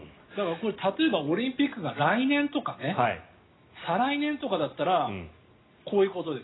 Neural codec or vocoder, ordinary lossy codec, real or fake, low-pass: none; AAC, 16 kbps; real; 7.2 kHz